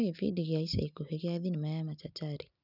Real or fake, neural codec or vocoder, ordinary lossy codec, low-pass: real; none; none; 5.4 kHz